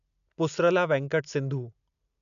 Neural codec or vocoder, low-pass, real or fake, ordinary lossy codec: none; 7.2 kHz; real; none